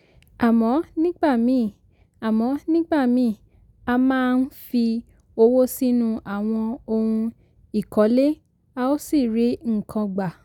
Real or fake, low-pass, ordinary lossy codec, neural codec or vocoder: real; 19.8 kHz; none; none